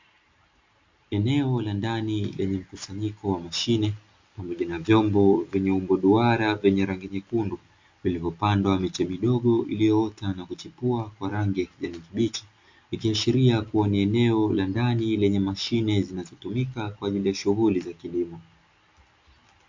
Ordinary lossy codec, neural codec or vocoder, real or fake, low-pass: MP3, 48 kbps; none; real; 7.2 kHz